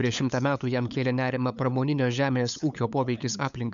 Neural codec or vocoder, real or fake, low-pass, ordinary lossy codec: codec, 16 kHz, 8 kbps, FunCodec, trained on LibriTTS, 25 frames a second; fake; 7.2 kHz; MP3, 96 kbps